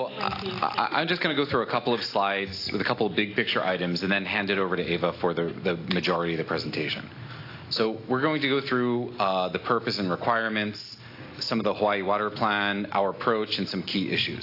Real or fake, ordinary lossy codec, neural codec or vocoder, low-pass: real; AAC, 32 kbps; none; 5.4 kHz